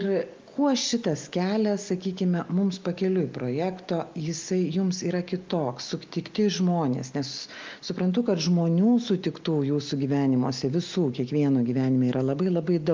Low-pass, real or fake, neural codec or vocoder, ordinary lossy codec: 7.2 kHz; real; none; Opus, 24 kbps